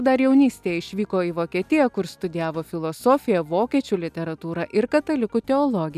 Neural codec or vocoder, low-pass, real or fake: none; 14.4 kHz; real